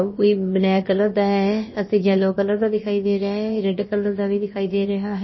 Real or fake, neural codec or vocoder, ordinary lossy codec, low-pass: fake; codec, 16 kHz, about 1 kbps, DyCAST, with the encoder's durations; MP3, 24 kbps; 7.2 kHz